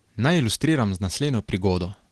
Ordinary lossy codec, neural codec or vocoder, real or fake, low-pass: Opus, 16 kbps; none; real; 10.8 kHz